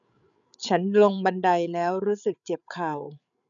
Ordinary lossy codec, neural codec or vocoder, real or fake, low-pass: none; codec, 16 kHz, 8 kbps, FreqCodec, larger model; fake; 7.2 kHz